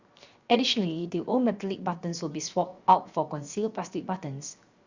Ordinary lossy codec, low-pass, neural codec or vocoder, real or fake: Opus, 64 kbps; 7.2 kHz; codec, 16 kHz, 0.7 kbps, FocalCodec; fake